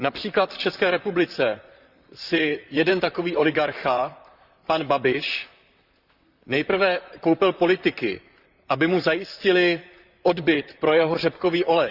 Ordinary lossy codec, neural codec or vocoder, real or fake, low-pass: Opus, 64 kbps; vocoder, 44.1 kHz, 128 mel bands, Pupu-Vocoder; fake; 5.4 kHz